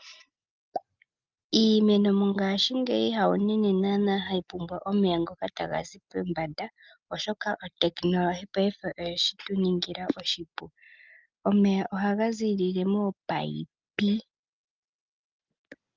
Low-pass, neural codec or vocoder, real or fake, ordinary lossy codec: 7.2 kHz; none; real; Opus, 24 kbps